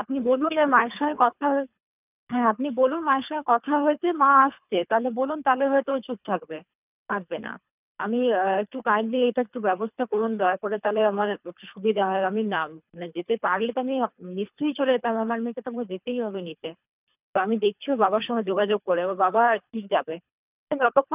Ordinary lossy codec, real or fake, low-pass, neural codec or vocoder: none; fake; 3.6 kHz; codec, 24 kHz, 3 kbps, HILCodec